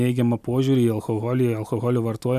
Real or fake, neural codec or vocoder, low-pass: fake; vocoder, 44.1 kHz, 128 mel bands every 256 samples, BigVGAN v2; 14.4 kHz